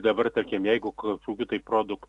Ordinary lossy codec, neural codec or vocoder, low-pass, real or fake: AAC, 64 kbps; none; 10.8 kHz; real